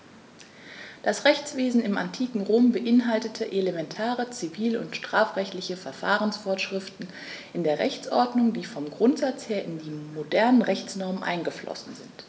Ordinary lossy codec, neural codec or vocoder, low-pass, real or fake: none; none; none; real